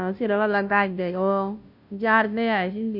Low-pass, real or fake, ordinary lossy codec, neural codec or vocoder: 5.4 kHz; fake; none; codec, 16 kHz, 0.5 kbps, FunCodec, trained on Chinese and English, 25 frames a second